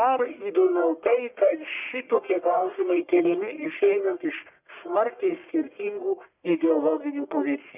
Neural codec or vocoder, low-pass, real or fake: codec, 44.1 kHz, 1.7 kbps, Pupu-Codec; 3.6 kHz; fake